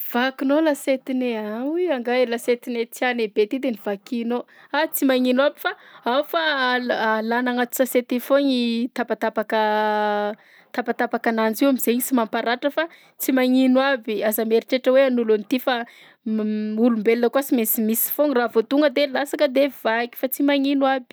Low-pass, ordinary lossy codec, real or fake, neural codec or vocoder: none; none; real; none